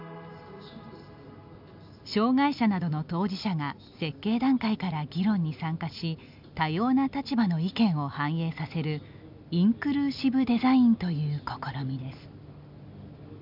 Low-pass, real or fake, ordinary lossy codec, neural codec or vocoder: 5.4 kHz; real; none; none